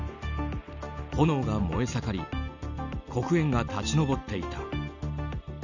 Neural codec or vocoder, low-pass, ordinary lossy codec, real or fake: none; 7.2 kHz; none; real